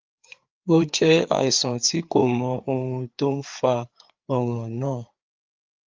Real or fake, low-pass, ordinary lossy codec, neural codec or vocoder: fake; 7.2 kHz; Opus, 32 kbps; codec, 16 kHz, 4 kbps, FreqCodec, larger model